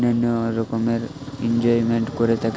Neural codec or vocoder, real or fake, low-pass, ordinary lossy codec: none; real; none; none